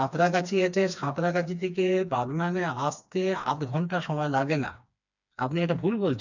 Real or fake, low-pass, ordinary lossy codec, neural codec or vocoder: fake; 7.2 kHz; none; codec, 16 kHz, 2 kbps, FreqCodec, smaller model